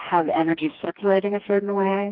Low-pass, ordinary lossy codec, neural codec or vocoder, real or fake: 5.4 kHz; Opus, 32 kbps; codec, 16 kHz, 2 kbps, FreqCodec, smaller model; fake